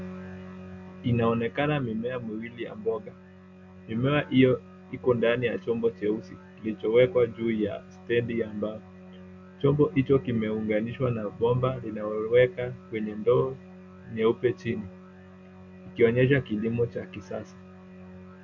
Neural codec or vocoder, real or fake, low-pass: none; real; 7.2 kHz